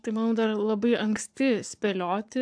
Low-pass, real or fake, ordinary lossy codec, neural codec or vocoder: 9.9 kHz; fake; AAC, 64 kbps; codec, 44.1 kHz, 7.8 kbps, Pupu-Codec